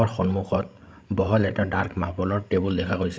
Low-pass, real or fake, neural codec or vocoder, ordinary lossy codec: none; fake; codec, 16 kHz, 16 kbps, FreqCodec, larger model; none